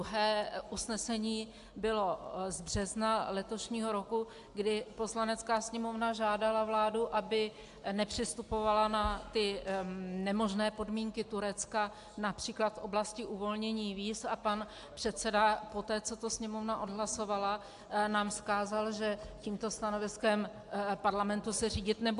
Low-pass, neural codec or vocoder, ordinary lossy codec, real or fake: 10.8 kHz; none; AAC, 64 kbps; real